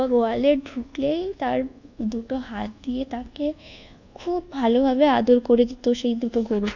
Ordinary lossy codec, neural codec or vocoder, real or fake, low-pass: none; codec, 24 kHz, 1.2 kbps, DualCodec; fake; 7.2 kHz